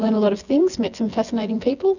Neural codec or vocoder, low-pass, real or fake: vocoder, 24 kHz, 100 mel bands, Vocos; 7.2 kHz; fake